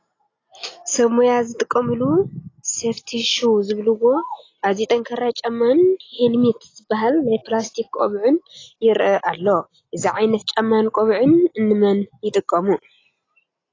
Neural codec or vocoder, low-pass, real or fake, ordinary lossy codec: none; 7.2 kHz; real; AAC, 32 kbps